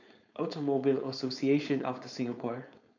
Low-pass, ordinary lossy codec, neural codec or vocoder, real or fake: 7.2 kHz; none; codec, 16 kHz, 4.8 kbps, FACodec; fake